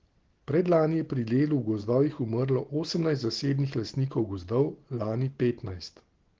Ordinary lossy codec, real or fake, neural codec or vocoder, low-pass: Opus, 16 kbps; real; none; 7.2 kHz